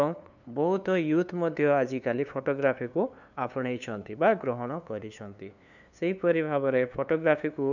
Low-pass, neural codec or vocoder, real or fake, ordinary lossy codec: 7.2 kHz; codec, 16 kHz, 2 kbps, FunCodec, trained on LibriTTS, 25 frames a second; fake; none